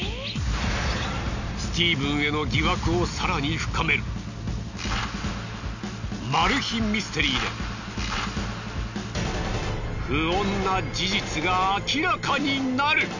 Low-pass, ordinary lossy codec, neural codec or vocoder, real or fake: 7.2 kHz; none; none; real